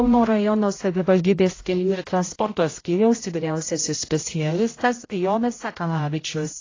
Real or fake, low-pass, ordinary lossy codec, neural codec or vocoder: fake; 7.2 kHz; AAC, 32 kbps; codec, 16 kHz, 0.5 kbps, X-Codec, HuBERT features, trained on general audio